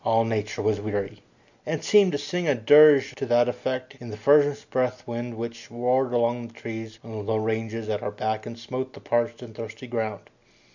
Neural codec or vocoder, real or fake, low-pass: none; real; 7.2 kHz